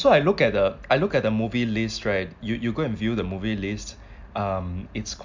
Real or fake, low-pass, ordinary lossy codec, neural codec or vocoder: real; 7.2 kHz; MP3, 64 kbps; none